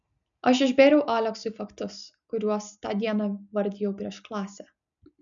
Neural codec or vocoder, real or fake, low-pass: none; real; 7.2 kHz